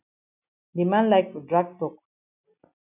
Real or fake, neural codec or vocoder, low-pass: real; none; 3.6 kHz